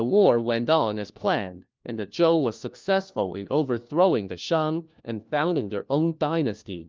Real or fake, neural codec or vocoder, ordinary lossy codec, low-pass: fake; codec, 16 kHz, 1 kbps, FunCodec, trained on LibriTTS, 50 frames a second; Opus, 24 kbps; 7.2 kHz